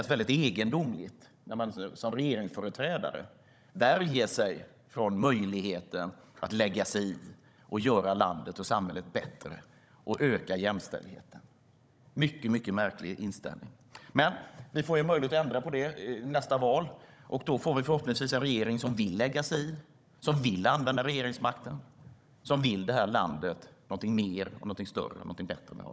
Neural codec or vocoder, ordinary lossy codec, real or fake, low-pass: codec, 16 kHz, 16 kbps, FunCodec, trained on Chinese and English, 50 frames a second; none; fake; none